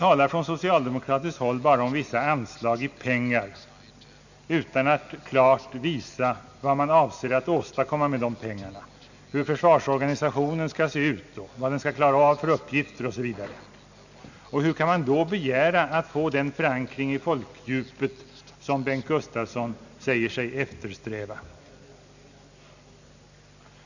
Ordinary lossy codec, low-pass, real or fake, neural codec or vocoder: none; 7.2 kHz; real; none